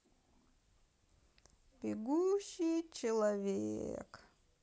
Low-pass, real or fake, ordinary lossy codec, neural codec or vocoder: none; real; none; none